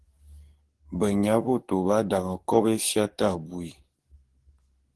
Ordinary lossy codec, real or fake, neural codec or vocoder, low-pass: Opus, 16 kbps; fake; codec, 44.1 kHz, 7.8 kbps, DAC; 10.8 kHz